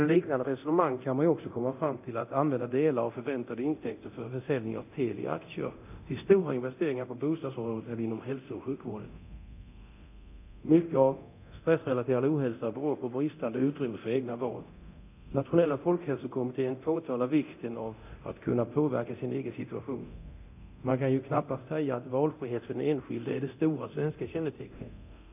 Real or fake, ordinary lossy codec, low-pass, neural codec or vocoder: fake; none; 3.6 kHz; codec, 24 kHz, 0.9 kbps, DualCodec